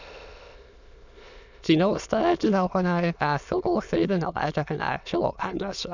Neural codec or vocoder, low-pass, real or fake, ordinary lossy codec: autoencoder, 22.05 kHz, a latent of 192 numbers a frame, VITS, trained on many speakers; 7.2 kHz; fake; none